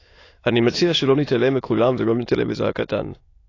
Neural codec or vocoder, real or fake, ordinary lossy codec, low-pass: autoencoder, 22.05 kHz, a latent of 192 numbers a frame, VITS, trained on many speakers; fake; AAC, 32 kbps; 7.2 kHz